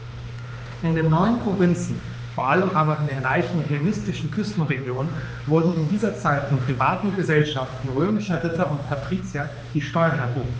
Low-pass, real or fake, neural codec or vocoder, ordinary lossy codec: none; fake; codec, 16 kHz, 2 kbps, X-Codec, HuBERT features, trained on balanced general audio; none